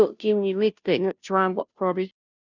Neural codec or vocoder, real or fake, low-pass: codec, 16 kHz, 0.5 kbps, FunCodec, trained on Chinese and English, 25 frames a second; fake; 7.2 kHz